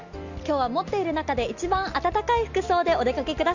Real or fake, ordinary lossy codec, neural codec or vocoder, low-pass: real; none; none; 7.2 kHz